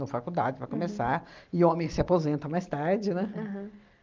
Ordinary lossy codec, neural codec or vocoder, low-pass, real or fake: Opus, 32 kbps; none; 7.2 kHz; real